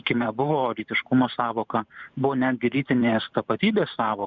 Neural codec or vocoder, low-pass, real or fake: vocoder, 44.1 kHz, 128 mel bands every 512 samples, BigVGAN v2; 7.2 kHz; fake